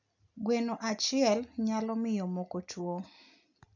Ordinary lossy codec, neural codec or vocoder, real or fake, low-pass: none; none; real; 7.2 kHz